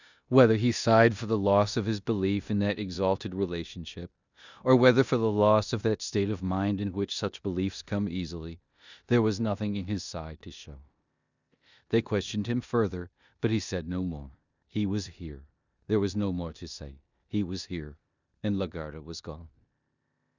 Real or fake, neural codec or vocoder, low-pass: fake; codec, 16 kHz in and 24 kHz out, 0.9 kbps, LongCat-Audio-Codec, four codebook decoder; 7.2 kHz